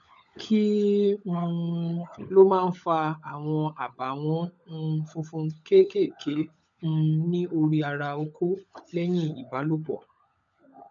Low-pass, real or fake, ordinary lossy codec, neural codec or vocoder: 7.2 kHz; fake; none; codec, 16 kHz, 16 kbps, FunCodec, trained on LibriTTS, 50 frames a second